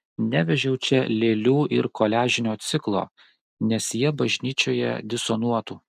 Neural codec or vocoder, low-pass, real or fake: none; 14.4 kHz; real